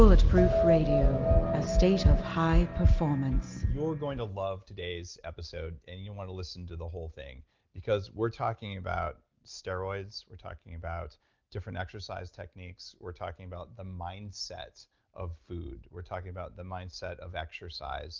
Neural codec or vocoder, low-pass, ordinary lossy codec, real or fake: none; 7.2 kHz; Opus, 32 kbps; real